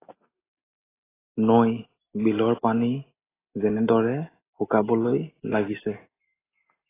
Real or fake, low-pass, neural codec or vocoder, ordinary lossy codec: real; 3.6 kHz; none; AAC, 16 kbps